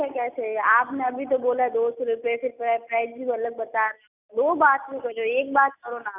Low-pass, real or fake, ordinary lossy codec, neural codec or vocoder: 3.6 kHz; real; none; none